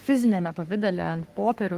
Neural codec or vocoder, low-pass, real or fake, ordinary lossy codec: codec, 44.1 kHz, 3.4 kbps, Pupu-Codec; 14.4 kHz; fake; Opus, 24 kbps